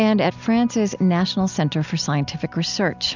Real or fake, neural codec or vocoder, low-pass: real; none; 7.2 kHz